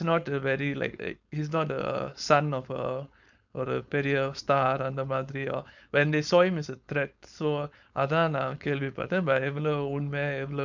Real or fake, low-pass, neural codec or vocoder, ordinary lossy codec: fake; 7.2 kHz; codec, 16 kHz, 4.8 kbps, FACodec; none